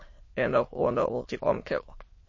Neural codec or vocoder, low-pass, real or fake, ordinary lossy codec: autoencoder, 22.05 kHz, a latent of 192 numbers a frame, VITS, trained on many speakers; 7.2 kHz; fake; MP3, 32 kbps